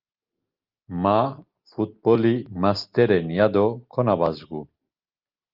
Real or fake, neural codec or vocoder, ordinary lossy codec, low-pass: real; none; Opus, 24 kbps; 5.4 kHz